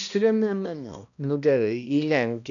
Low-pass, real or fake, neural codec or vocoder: 7.2 kHz; fake; codec, 16 kHz, 1 kbps, X-Codec, HuBERT features, trained on balanced general audio